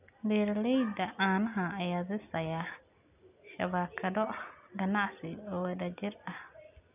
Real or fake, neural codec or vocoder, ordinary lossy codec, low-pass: real; none; none; 3.6 kHz